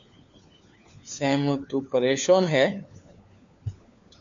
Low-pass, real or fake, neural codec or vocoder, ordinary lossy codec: 7.2 kHz; fake; codec, 16 kHz, 4 kbps, FunCodec, trained on LibriTTS, 50 frames a second; MP3, 64 kbps